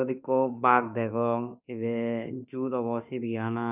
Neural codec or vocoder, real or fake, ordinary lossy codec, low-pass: autoencoder, 48 kHz, 32 numbers a frame, DAC-VAE, trained on Japanese speech; fake; none; 3.6 kHz